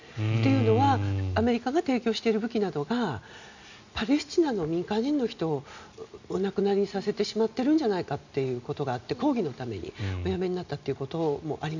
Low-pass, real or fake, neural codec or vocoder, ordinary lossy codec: 7.2 kHz; real; none; none